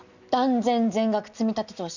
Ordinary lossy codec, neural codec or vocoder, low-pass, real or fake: none; none; 7.2 kHz; real